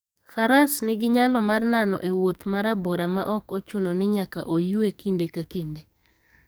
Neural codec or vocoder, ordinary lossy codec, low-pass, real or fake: codec, 44.1 kHz, 2.6 kbps, SNAC; none; none; fake